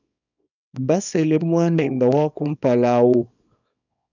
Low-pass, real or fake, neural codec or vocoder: 7.2 kHz; fake; codec, 24 kHz, 0.9 kbps, WavTokenizer, small release